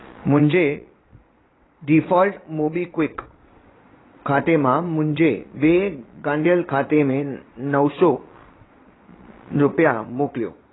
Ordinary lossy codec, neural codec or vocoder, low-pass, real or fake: AAC, 16 kbps; vocoder, 22.05 kHz, 80 mel bands, Vocos; 7.2 kHz; fake